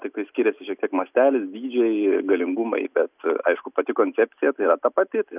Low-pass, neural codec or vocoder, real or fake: 3.6 kHz; none; real